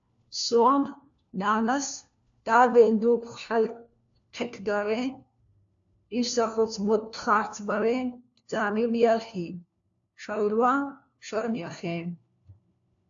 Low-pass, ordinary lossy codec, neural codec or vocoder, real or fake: 7.2 kHz; AAC, 64 kbps; codec, 16 kHz, 1 kbps, FunCodec, trained on LibriTTS, 50 frames a second; fake